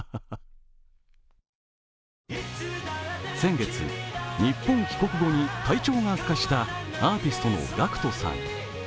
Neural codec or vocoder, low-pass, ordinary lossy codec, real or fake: none; none; none; real